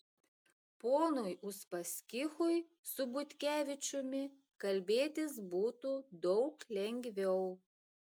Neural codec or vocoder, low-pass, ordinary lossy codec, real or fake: none; 19.8 kHz; MP3, 96 kbps; real